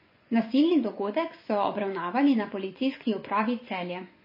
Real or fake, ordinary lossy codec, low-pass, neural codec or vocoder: fake; MP3, 24 kbps; 5.4 kHz; vocoder, 24 kHz, 100 mel bands, Vocos